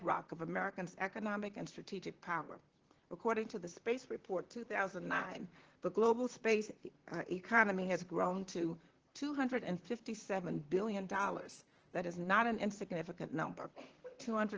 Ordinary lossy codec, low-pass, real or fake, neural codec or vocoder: Opus, 16 kbps; 7.2 kHz; fake; vocoder, 44.1 kHz, 128 mel bands, Pupu-Vocoder